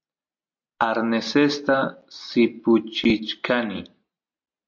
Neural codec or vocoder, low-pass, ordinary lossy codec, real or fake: none; 7.2 kHz; MP3, 48 kbps; real